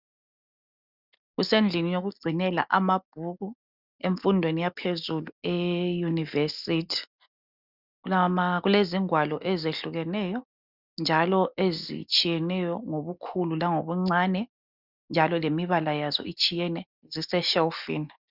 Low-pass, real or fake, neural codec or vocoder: 5.4 kHz; real; none